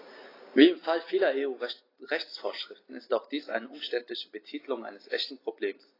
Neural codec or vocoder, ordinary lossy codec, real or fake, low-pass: none; AAC, 24 kbps; real; 5.4 kHz